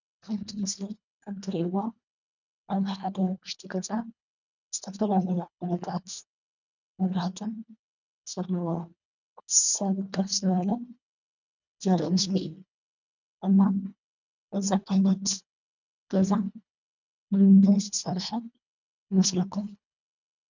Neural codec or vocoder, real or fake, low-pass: codec, 24 kHz, 1.5 kbps, HILCodec; fake; 7.2 kHz